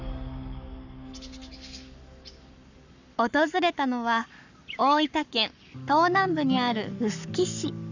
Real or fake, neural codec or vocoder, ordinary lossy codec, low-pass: fake; codec, 44.1 kHz, 7.8 kbps, Pupu-Codec; none; 7.2 kHz